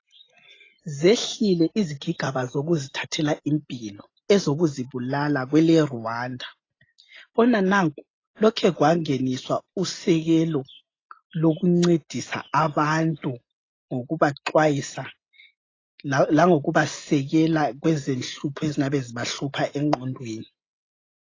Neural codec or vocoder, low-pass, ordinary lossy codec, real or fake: none; 7.2 kHz; AAC, 32 kbps; real